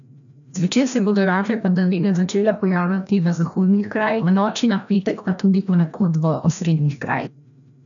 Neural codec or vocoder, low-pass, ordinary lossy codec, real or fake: codec, 16 kHz, 1 kbps, FreqCodec, larger model; 7.2 kHz; none; fake